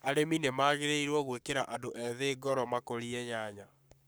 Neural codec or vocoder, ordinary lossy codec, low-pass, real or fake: codec, 44.1 kHz, 7.8 kbps, Pupu-Codec; none; none; fake